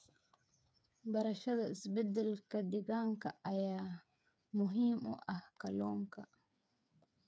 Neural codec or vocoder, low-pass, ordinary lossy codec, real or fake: codec, 16 kHz, 8 kbps, FreqCodec, smaller model; none; none; fake